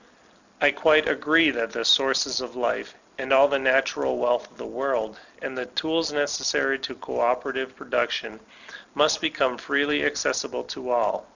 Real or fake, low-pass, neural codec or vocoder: real; 7.2 kHz; none